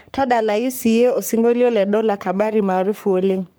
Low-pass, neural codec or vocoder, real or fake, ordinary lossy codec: none; codec, 44.1 kHz, 3.4 kbps, Pupu-Codec; fake; none